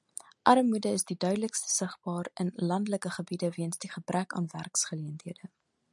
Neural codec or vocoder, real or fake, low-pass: none; real; 10.8 kHz